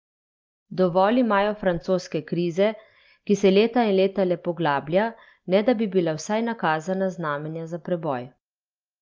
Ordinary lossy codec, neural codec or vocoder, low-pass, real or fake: Opus, 24 kbps; none; 7.2 kHz; real